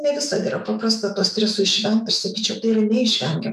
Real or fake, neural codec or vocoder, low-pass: fake; autoencoder, 48 kHz, 128 numbers a frame, DAC-VAE, trained on Japanese speech; 14.4 kHz